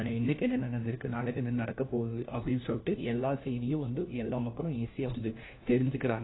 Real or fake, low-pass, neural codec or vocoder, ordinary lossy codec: fake; 7.2 kHz; codec, 16 kHz, 1 kbps, FunCodec, trained on LibriTTS, 50 frames a second; AAC, 16 kbps